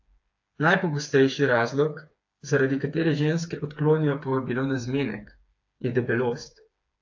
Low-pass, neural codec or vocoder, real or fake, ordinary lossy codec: 7.2 kHz; codec, 16 kHz, 4 kbps, FreqCodec, smaller model; fake; AAC, 48 kbps